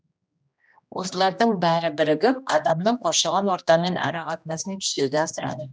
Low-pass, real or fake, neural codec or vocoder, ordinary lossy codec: none; fake; codec, 16 kHz, 1 kbps, X-Codec, HuBERT features, trained on general audio; none